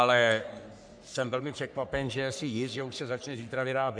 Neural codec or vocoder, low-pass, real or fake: codec, 44.1 kHz, 3.4 kbps, Pupu-Codec; 9.9 kHz; fake